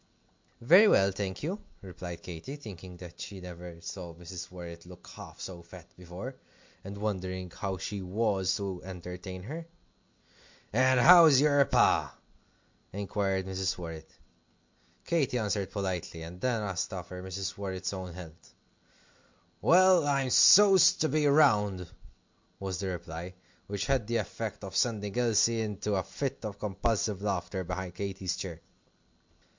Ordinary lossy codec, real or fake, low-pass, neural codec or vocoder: MP3, 64 kbps; real; 7.2 kHz; none